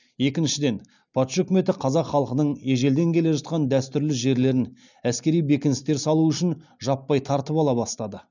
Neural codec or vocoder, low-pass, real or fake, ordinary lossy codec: none; 7.2 kHz; real; none